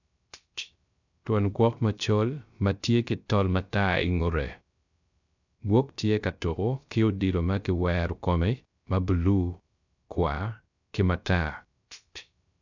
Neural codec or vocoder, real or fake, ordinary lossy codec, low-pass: codec, 16 kHz, 0.3 kbps, FocalCodec; fake; none; 7.2 kHz